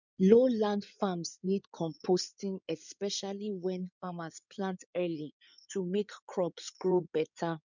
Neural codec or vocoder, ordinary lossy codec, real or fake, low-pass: codec, 16 kHz in and 24 kHz out, 2.2 kbps, FireRedTTS-2 codec; none; fake; 7.2 kHz